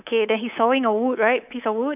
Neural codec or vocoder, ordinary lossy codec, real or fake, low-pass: codec, 24 kHz, 3.1 kbps, DualCodec; none; fake; 3.6 kHz